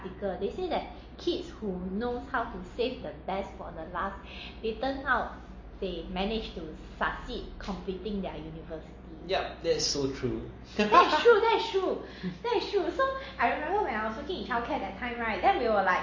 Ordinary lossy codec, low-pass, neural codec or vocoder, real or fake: MP3, 32 kbps; 7.2 kHz; none; real